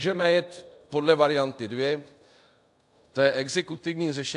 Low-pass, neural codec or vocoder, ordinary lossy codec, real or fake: 10.8 kHz; codec, 24 kHz, 0.5 kbps, DualCodec; AAC, 64 kbps; fake